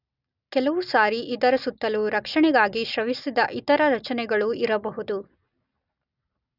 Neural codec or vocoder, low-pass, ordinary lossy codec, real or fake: none; 5.4 kHz; none; real